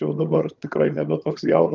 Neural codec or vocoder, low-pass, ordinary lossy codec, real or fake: vocoder, 22.05 kHz, 80 mel bands, HiFi-GAN; 7.2 kHz; Opus, 24 kbps; fake